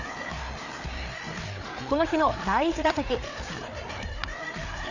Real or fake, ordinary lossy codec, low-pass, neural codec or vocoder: fake; none; 7.2 kHz; codec, 16 kHz, 4 kbps, FreqCodec, larger model